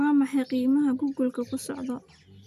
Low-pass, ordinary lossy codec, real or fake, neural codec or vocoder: 14.4 kHz; none; real; none